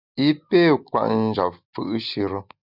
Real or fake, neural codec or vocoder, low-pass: real; none; 5.4 kHz